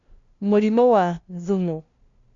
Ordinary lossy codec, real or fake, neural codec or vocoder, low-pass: none; fake; codec, 16 kHz, 0.5 kbps, FunCodec, trained on LibriTTS, 25 frames a second; 7.2 kHz